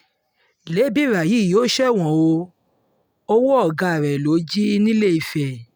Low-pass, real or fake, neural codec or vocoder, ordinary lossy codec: none; real; none; none